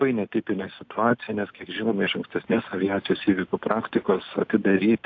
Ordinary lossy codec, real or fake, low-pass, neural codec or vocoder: AAC, 48 kbps; real; 7.2 kHz; none